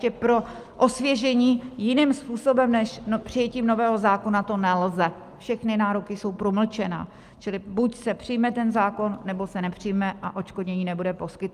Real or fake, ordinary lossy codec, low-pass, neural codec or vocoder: real; Opus, 32 kbps; 14.4 kHz; none